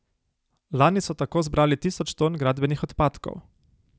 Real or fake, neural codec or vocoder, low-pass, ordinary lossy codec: real; none; none; none